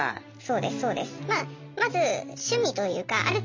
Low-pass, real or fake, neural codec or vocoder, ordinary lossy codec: 7.2 kHz; real; none; MP3, 64 kbps